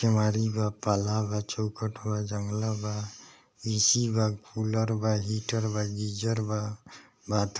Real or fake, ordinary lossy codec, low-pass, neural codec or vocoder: real; none; none; none